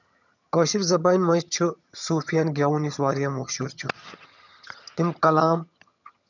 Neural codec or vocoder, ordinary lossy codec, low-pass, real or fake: vocoder, 22.05 kHz, 80 mel bands, HiFi-GAN; none; 7.2 kHz; fake